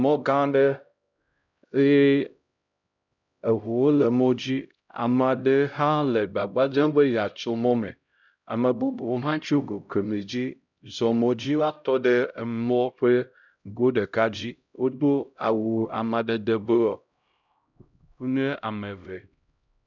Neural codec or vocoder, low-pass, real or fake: codec, 16 kHz, 0.5 kbps, X-Codec, HuBERT features, trained on LibriSpeech; 7.2 kHz; fake